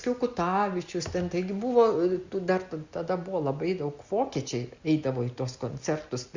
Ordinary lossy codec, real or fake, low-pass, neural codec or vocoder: Opus, 64 kbps; real; 7.2 kHz; none